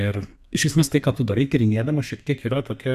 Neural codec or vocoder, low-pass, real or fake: codec, 32 kHz, 1.9 kbps, SNAC; 14.4 kHz; fake